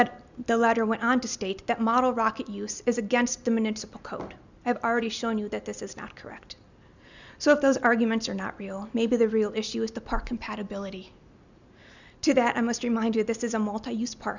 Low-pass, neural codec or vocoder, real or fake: 7.2 kHz; none; real